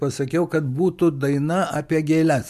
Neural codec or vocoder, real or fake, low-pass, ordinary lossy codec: vocoder, 44.1 kHz, 128 mel bands every 256 samples, BigVGAN v2; fake; 14.4 kHz; MP3, 64 kbps